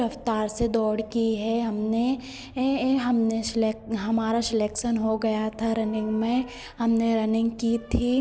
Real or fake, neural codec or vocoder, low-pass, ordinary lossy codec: real; none; none; none